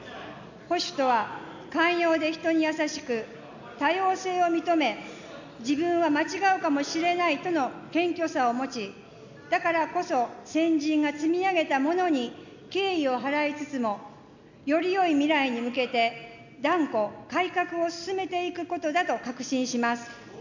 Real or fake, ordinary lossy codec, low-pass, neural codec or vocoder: real; none; 7.2 kHz; none